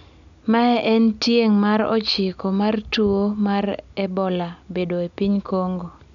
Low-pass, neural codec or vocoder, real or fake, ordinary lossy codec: 7.2 kHz; none; real; none